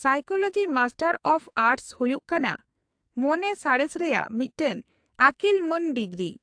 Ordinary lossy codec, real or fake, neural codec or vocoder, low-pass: AAC, 64 kbps; fake; codec, 44.1 kHz, 2.6 kbps, SNAC; 9.9 kHz